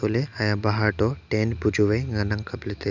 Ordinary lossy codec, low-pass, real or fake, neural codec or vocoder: none; 7.2 kHz; real; none